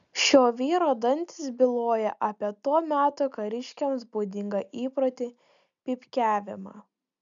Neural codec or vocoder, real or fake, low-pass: none; real; 7.2 kHz